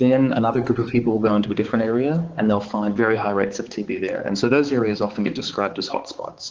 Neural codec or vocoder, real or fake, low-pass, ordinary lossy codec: codec, 16 kHz, 4 kbps, X-Codec, HuBERT features, trained on balanced general audio; fake; 7.2 kHz; Opus, 16 kbps